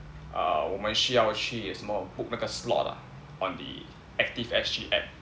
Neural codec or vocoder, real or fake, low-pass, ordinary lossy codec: none; real; none; none